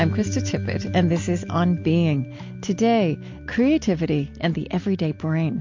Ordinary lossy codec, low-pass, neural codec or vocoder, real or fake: MP3, 48 kbps; 7.2 kHz; none; real